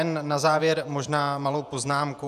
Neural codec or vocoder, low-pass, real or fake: vocoder, 48 kHz, 128 mel bands, Vocos; 14.4 kHz; fake